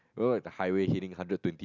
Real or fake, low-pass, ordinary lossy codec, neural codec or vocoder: real; 7.2 kHz; none; none